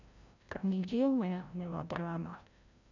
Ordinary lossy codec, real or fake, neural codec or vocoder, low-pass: none; fake; codec, 16 kHz, 0.5 kbps, FreqCodec, larger model; 7.2 kHz